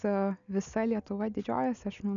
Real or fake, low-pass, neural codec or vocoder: real; 7.2 kHz; none